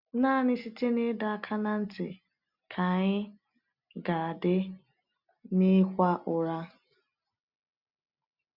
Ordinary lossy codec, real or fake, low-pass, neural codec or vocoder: none; real; 5.4 kHz; none